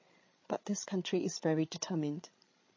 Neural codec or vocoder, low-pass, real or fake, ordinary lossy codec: codec, 16 kHz, 16 kbps, FreqCodec, larger model; 7.2 kHz; fake; MP3, 32 kbps